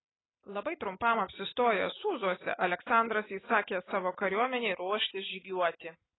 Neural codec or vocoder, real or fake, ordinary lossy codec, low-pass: none; real; AAC, 16 kbps; 7.2 kHz